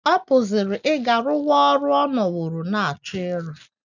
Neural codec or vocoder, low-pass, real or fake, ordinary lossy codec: none; 7.2 kHz; real; none